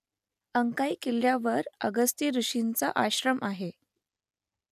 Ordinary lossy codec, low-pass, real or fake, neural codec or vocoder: none; 14.4 kHz; real; none